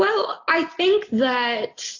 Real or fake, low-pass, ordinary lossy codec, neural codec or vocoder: real; 7.2 kHz; AAC, 32 kbps; none